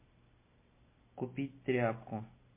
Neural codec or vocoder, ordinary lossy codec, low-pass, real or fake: none; MP3, 24 kbps; 3.6 kHz; real